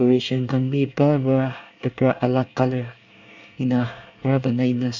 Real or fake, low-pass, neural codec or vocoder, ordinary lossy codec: fake; 7.2 kHz; codec, 24 kHz, 1 kbps, SNAC; none